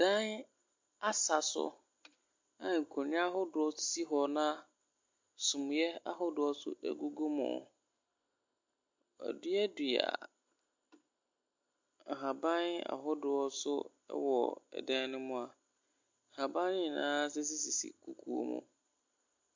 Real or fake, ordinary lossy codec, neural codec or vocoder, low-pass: real; MP3, 48 kbps; none; 7.2 kHz